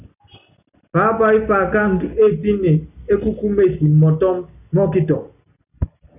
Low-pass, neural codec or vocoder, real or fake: 3.6 kHz; none; real